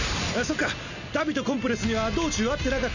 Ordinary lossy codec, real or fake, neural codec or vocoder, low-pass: none; real; none; 7.2 kHz